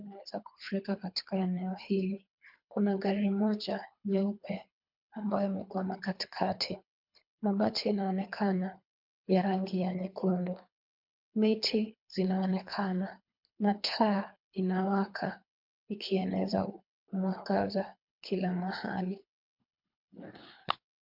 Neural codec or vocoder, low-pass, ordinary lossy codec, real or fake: codec, 24 kHz, 3 kbps, HILCodec; 5.4 kHz; MP3, 48 kbps; fake